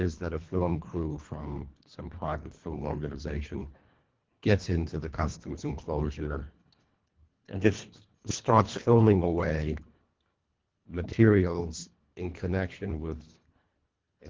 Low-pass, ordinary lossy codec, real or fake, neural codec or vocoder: 7.2 kHz; Opus, 16 kbps; fake; codec, 24 kHz, 1.5 kbps, HILCodec